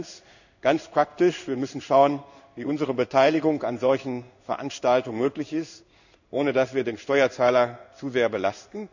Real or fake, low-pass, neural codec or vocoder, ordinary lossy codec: fake; 7.2 kHz; codec, 16 kHz in and 24 kHz out, 1 kbps, XY-Tokenizer; none